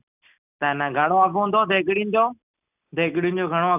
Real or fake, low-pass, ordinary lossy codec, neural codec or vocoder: real; 3.6 kHz; none; none